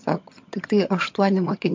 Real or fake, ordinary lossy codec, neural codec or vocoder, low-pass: fake; MP3, 48 kbps; vocoder, 22.05 kHz, 80 mel bands, HiFi-GAN; 7.2 kHz